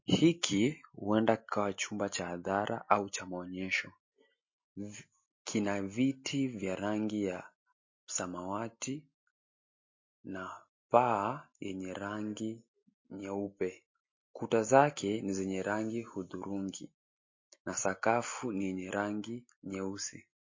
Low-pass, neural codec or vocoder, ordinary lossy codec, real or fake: 7.2 kHz; none; MP3, 32 kbps; real